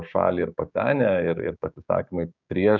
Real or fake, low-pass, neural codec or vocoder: fake; 7.2 kHz; codec, 16 kHz, 6 kbps, DAC